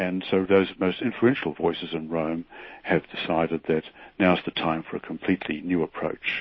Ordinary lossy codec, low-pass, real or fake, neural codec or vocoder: MP3, 24 kbps; 7.2 kHz; real; none